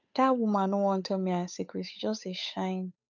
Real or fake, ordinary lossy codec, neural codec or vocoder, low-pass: fake; none; codec, 16 kHz, 8 kbps, FunCodec, trained on Chinese and English, 25 frames a second; 7.2 kHz